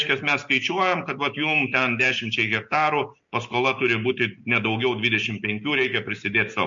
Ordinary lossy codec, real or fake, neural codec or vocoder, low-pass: MP3, 48 kbps; real; none; 7.2 kHz